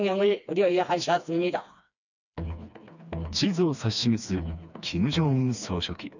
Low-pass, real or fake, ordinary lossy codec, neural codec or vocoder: 7.2 kHz; fake; none; codec, 16 kHz, 2 kbps, FreqCodec, smaller model